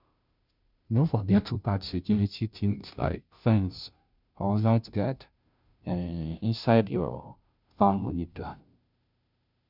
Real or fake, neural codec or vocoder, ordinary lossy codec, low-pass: fake; codec, 16 kHz, 0.5 kbps, FunCodec, trained on Chinese and English, 25 frames a second; none; 5.4 kHz